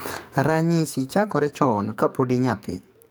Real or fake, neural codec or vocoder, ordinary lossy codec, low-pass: fake; codec, 44.1 kHz, 2.6 kbps, SNAC; none; none